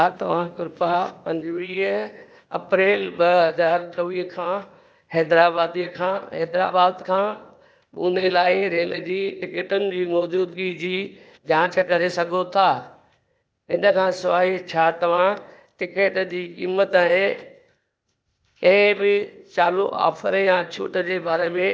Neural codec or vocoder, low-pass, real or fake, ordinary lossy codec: codec, 16 kHz, 0.8 kbps, ZipCodec; none; fake; none